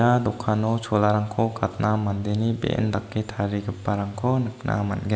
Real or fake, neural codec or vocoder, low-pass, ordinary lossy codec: real; none; none; none